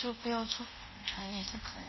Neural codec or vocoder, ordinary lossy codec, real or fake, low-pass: codec, 24 kHz, 0.5 kbps, DualCodec; MP3, 24 kbps; fake; 7.2 kHz